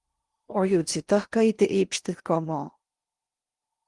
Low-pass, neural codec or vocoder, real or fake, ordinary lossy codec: 10.8 kHz; codec, 16 kHz in and 24 kHz out, 0.6 kbps, FocalCodec, streaming, 4096 codes; fake; Opus, 24 kbps